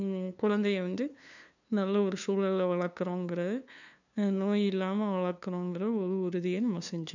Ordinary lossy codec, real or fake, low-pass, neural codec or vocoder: none; fake; 7.2 kHz; codec, 16 kHz, 2 kbps, FunCodec, trained on LibriTTS, 25 frames a second